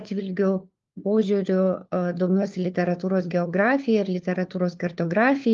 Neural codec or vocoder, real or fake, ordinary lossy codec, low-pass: codec, 16 kHz, 4 kbps, FunCodec, trained on LibriTTS, 50 frames a second; fake; Opus, 32 kbps; 7.2 kHz